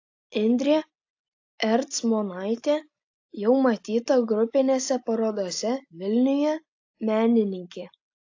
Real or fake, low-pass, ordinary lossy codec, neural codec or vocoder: real; 7.2 kHz; AAC, 48 kbps; none